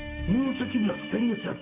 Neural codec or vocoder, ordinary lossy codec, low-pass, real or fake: codec, 44.1 kHz, 7.8 kbps, DAC; none; 3.6 kHz; fake